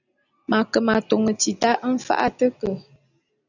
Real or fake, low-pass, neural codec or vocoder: real; 7.2 kHz; none